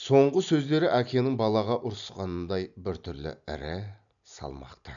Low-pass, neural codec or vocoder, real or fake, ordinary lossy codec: 7.2 kHz; none; real; none